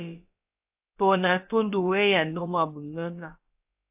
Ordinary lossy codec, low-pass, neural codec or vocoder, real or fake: AAC, 32 kbps; 3.6 kHz; codec, 16 kHz, about 1 kbps, DyCAST, with the encoder's durations; fake